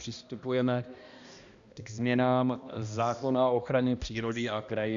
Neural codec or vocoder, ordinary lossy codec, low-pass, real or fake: codec, 16 kHz, 1 kbps, X-Codec, HuBERT features, trained on balanced general audio; Opus, 64 kbps; 7.2 kHz; fake